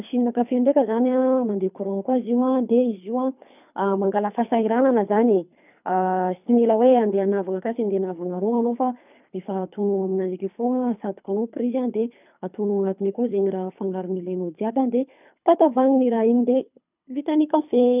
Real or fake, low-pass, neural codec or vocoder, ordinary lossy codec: fake; 3.6 kHz; codec, 24 kHz, 3 kbps, HILCodec; none